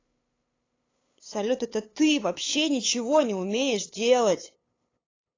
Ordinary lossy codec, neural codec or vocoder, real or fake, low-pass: AAC, 32 kbps; codec, 16 kHz, 8 kbps, FunCodec, trained on LibriTTS, 25 frames a second; fake; 7.2 kHz